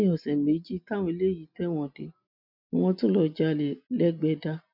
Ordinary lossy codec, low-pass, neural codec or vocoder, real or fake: none; 5.4 kHz; none; real